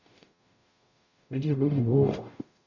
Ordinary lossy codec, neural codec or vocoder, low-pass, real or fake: none; codec, 44.1 kHz, 0.9 kbps, DAC; 7.2 kHz; fake